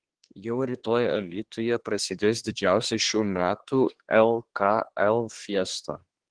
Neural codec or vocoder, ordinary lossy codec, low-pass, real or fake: codec, 24 kHz, 1 kbps, SNAC; Opus, 16 kbps; 9.9 kHz; fake